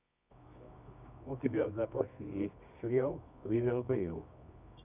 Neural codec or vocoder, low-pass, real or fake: codec, 24 kHz, 0.9 kbps, WavTokenizer, medium music audio release; 3.6 kHz; fake